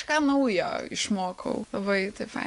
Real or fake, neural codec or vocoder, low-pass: fake; vocoder, 24 kHz, 100 mel bands, Vocos; 10.8 kHz